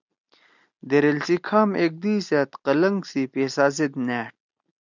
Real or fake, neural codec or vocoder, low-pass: real; none; 7.2 kHz